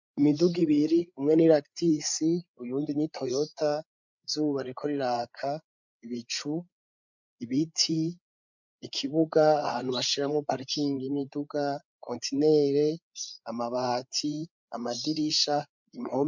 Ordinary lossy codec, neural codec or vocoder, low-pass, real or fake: MP3, 64 kbps; codec, 16 kHz, 8 kbps, FreqCodec, larger model; 7.2 kHz; fake